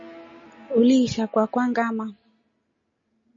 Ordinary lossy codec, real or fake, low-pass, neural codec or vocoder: MP3, 32 kbps; real; 7.2 kHz; none